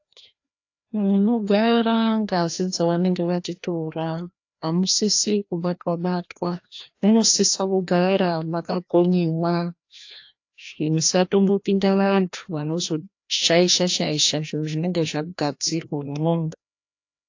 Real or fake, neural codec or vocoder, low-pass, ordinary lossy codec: fake; codec, 16 kHz, 1 kbps, FreqCodec, larger model; 7.2 kHz; AAC, 48 kbps